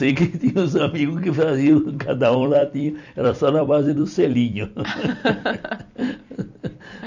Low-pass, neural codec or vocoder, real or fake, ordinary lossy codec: 7.2 kHz; vocoder, 44.1 kHz, 128 mel bands every 256 samples, BigVGAN v2; fake; none